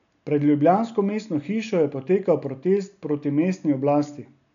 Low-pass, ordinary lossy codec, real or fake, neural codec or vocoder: 7.2 kHz; none; real; none